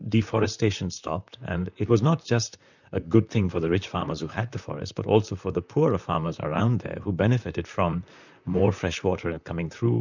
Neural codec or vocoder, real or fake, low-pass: vocoder, 44.1 kHz, 128 mel bands, Pupu-Vocoder; fake; 7.2 kHz